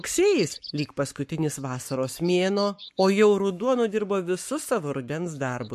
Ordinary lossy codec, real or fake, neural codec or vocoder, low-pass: MP3, 64 kbps; fake; codec, 44.1 kHz, 7.8 kbps, Pupu-Codec; 14.4 kHz